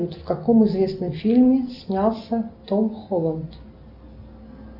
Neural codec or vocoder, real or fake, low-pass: none; real; 5.4 kHz